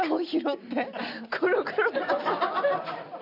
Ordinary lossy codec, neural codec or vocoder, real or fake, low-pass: none; none; real; 5.4 kHz